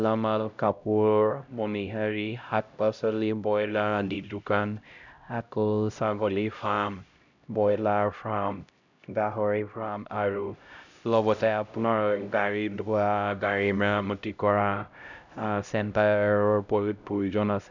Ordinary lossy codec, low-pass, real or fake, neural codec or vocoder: none; 7.2 kHz; fake; codec, 16 kHz, 0.5 kbps, X-Codec, HuBERT features, trained on LibriSpeech